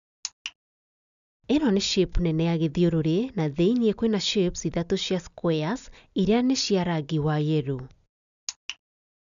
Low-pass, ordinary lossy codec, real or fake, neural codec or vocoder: 7.2 kHz; none; real; none